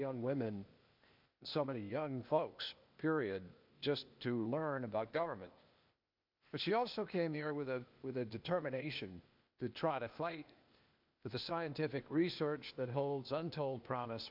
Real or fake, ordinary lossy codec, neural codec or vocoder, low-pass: fake; MP3, 48 kbps; codec, 16 kHz, 0.8 kbps, ZipCodec; 5.4 kHz